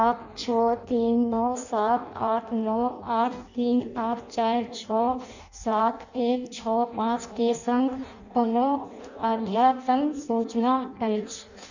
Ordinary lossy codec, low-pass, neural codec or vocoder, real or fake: none; 7.2 kHz; codec, 16 kHz in and 24 kHz out, 0.6 kbps, FireRedTTS-2 codec; fake